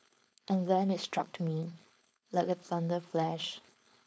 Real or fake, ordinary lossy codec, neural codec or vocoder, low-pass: fake; none; codec, 16 kHz, 4.8 kbps, FACodec; none